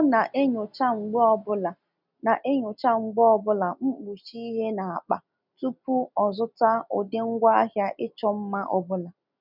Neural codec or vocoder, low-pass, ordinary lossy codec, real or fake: none; 5.4 kHz; none; real